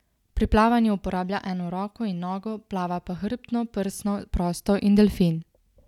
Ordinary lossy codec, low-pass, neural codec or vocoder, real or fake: none; 19.8 kHz; none; real